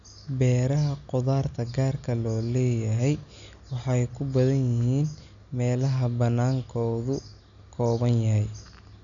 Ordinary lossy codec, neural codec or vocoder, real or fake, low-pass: none; none; real; 7.2 kHz